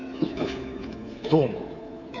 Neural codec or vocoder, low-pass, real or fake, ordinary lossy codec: codec, 24 kHz, 3.1 kbps, DualCodec; 7.2 kHz; fake; none